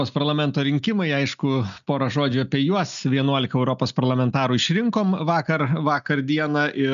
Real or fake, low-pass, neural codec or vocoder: real; 7.2 kHz; none